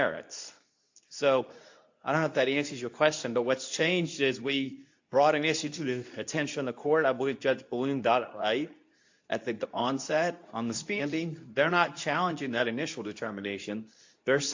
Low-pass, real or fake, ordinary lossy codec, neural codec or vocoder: 7.2 kHz; fake; AAC, 48 kbps; codec, 24 kHz, 0.9 kbps, WavTokenizer, medium speech release version 2